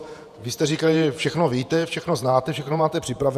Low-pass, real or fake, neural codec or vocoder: 14.4 kHz; fake; vocoder, 48 kHz, 128 mel bands, Vocos